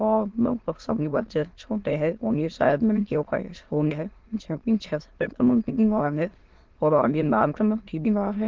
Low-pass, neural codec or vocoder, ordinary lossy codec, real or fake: 7.2 kHz; autoencoder, 22.05 kHz, a latent of 192 numbers a frame, VITS, trained on many speakers; Opus, 16 kbps; fake